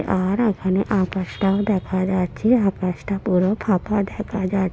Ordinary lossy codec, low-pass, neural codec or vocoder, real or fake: none; none; none; real